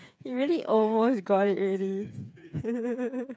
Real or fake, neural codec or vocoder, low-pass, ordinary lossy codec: fake; codec, 16 kHz, 8 kbps, FreqCodec, smaller model; none; none